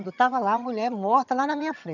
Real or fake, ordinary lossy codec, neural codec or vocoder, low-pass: fake; none; vocoder, 22.05 kHz, 80 mel bands, HiFi-GAN; 7.2 kHz